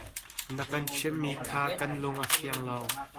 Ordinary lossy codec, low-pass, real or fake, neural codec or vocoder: Opus, 32 kbps; 14.4 kHz; fake; codec, 44.1 kHz, 7.8 kbps, Pupu-Codec